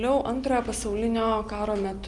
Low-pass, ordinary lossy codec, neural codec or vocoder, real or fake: 10.8 kHz; Opus, 32 kbps; none; real